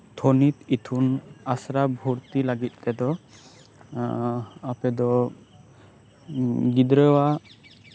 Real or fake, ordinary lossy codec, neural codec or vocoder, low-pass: real; none; none; none